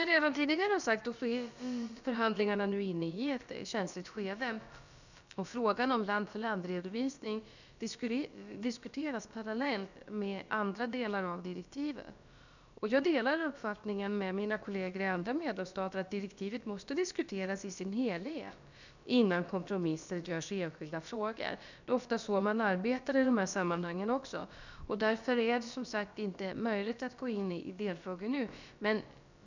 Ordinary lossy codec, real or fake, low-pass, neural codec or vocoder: none; fake; 7.2 kHz; codec, 16 kHz, about 1 kbps, DyCAST, with the encoder's durations